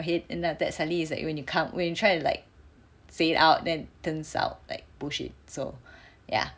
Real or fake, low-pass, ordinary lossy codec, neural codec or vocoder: real; none; none; none